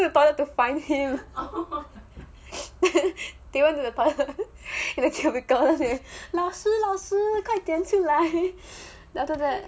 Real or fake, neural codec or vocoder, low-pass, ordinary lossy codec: real; none; none; none